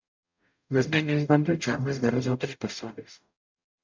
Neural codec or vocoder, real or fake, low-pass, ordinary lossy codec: codec, 44.1 kHz, 0.9 kbps, DAC; fake; 7.2 kHz; MP3, 64 kbps